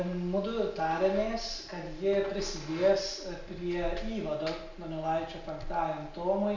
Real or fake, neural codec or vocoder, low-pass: real; none; 7.2 kHz